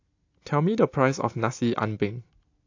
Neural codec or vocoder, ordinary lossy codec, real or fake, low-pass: none; AAC, 48 kbps; real; 7.2 kHz